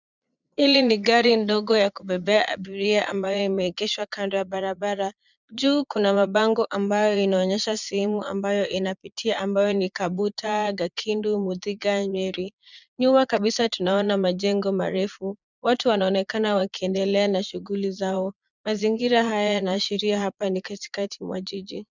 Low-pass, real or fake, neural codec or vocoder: 7.2 kHz; fake; vocoder, 22.05 kHz, 80 mel bands, Vocos